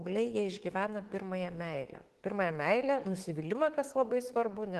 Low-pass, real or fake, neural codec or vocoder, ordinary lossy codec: 14.4 kHz; fake; autoencoder, 48 kHz, 32 numbers a frame, DAC-VAE, trained on Japanese speech; Opus, 16 kbps